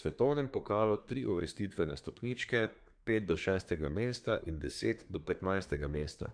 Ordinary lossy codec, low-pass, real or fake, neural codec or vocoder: none; 9.9 kHz; fake; codec, 24 kHz, 1 kbps, SNAC